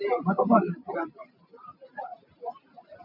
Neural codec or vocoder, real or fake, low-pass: none; real; 5.4 kHz